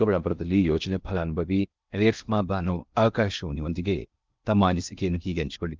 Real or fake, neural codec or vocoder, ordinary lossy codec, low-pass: fake; codec, 16 kHz in and 24 kHz out, 0.6 kbps, FocalCodec, streaming, 2048 codes; Opus, 24 kbps; 7.2 kHz